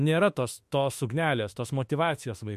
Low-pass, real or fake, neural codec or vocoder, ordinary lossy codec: 14.4 kHz; fake; autoencoder, 48 kHz, 32 numbers a frame, DAC-VAE, trained on Japanese speech; MP3, 64 kbps